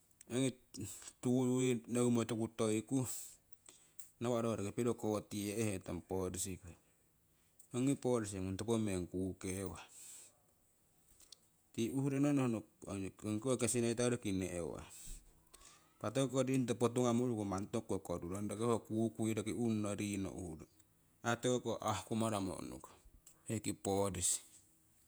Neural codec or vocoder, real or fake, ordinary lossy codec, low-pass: vocoder, 48 kHz, 128 mel bands, Vocos; fake; none; none